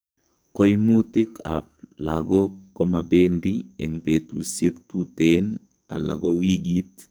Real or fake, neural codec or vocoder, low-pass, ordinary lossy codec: fake; codec, 44.1 kHz, 2.6 kbps, SNAC; none; none